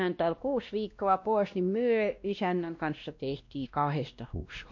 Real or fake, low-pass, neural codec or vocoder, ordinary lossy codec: fake; 7.2 kHz; codec, 16 kHz, 1 kbps, X-Codec, WavLM features, trained on Multilingual LibriSpeech; MP3, 48 kbps